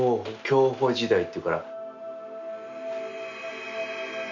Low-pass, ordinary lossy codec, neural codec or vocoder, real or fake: 7.2 kHz; none; none; real